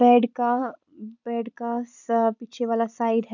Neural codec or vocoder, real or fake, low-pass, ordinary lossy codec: vocoder, 44.1 kHz, 128 mel bands every 256 samples, BigVGAN v2; fake; 7.2 kHz; none